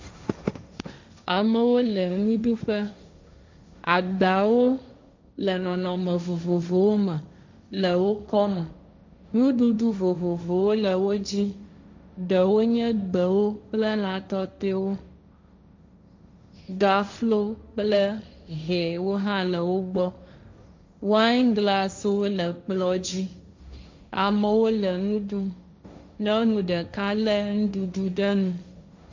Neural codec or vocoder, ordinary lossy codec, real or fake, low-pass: codec, 16 kHz, 1.1 kbps, Voila-Tokenizer; MP3, 64 kbps; fake; 7.2 kHz